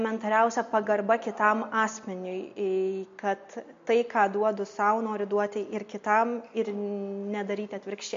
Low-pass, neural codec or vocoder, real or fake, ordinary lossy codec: 7.2 kHz; none; real; MP3, 48 kbps